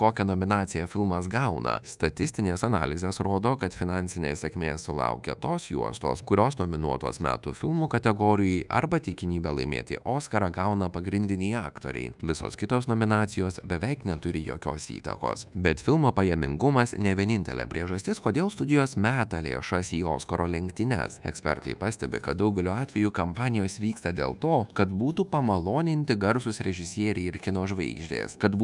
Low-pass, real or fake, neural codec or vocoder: 10.8 kHz; fake; codec, 24 kHz, 1.2 kbps, DualCodec